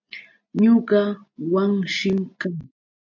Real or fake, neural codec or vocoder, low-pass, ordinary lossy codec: real; none; 7.2 kHz; MP3, 64 kbps